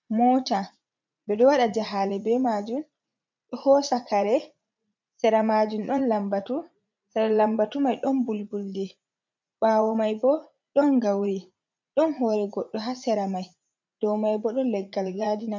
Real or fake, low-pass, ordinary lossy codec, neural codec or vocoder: fake; 7.2 kHz; MP3, 64 kbps; vocoder, 44.1 kHz, 80 mel bands, Vocos